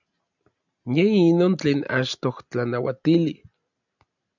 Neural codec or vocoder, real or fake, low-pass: none; real; 7.2 kHz